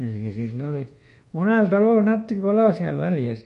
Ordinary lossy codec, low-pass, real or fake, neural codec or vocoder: MP3, 48 kbps; 10.8 kHz; fake; codec, 24 kHz, 1.2 kbps, DualCodec